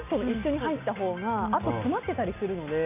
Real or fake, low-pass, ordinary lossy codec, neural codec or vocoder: real; 3.6 kHz; none; none